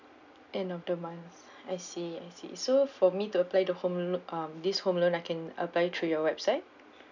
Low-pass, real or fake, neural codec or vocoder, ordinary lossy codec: 7.2 kHz; real; none; none